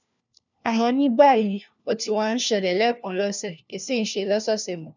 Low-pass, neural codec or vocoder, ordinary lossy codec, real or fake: 7.2 kHz; codec, 16 kHz, 1 kbps, FunCodec, trained on LibriTTS, 50 frames a second; none; fake